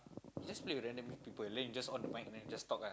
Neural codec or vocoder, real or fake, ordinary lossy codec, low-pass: none; real; none; none